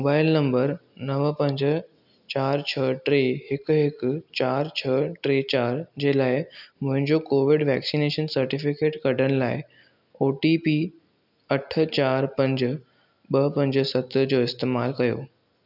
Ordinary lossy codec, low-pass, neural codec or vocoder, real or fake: none; 5.4 kHz; none; real